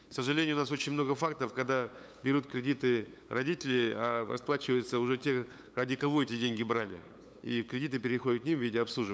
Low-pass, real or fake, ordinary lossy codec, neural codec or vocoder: none; fake; none; codec, 16 kHz, 8 kbps, FunCodec, trained on LibriTTS, 25 frames a second